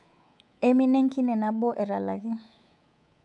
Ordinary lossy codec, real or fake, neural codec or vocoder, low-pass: none; fake; codec, 24 kHz, 3.1 kbps, DualCodec; 10.8 kHz